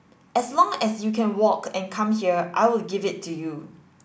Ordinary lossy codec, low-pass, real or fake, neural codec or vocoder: none; none; real; none